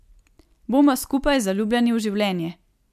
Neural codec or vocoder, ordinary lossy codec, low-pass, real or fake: none; MP3, 96 kbps; 14.4 kHz; real